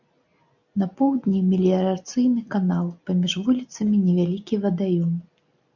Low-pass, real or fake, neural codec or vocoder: 7.2 kHz; real; none